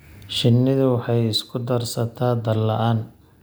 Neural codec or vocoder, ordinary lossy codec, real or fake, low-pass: none; none; real; none